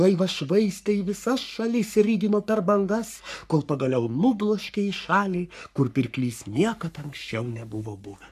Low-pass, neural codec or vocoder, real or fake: 14.4 kHz; codec, 44.1 kHz, 3.4 kbps, Pupu-Codec; fake